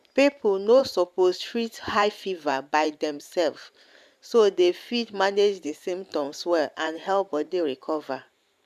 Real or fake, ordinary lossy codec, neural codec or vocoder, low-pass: fake; MP3, 96 kbps; vocoder, 44.1 kHz, 128 mel bands every 512 samples, BigVGAN v2; 14.4 kHz